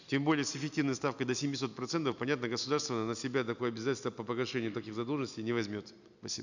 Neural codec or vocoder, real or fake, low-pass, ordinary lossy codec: none; real; 7.2 kHz; none